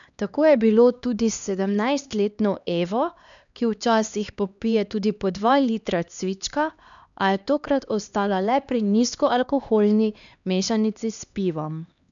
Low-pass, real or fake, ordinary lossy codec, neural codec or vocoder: 7.2 kHz; fake; none; codec, 16 kHz, 2 kbps, X-Codec, HuBERT features, trained on LibriSpeech